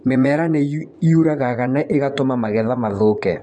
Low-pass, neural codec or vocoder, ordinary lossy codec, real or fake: none; vocoder, 24 kHz, 100 mel bands, Vocos; none; fake